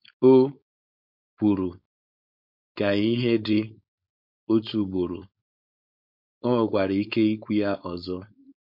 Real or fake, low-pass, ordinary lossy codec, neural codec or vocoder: fake; 5.4 kHz; MP3, 48 kbps; codec, 16 kHz, 4.8 kbps, FACodec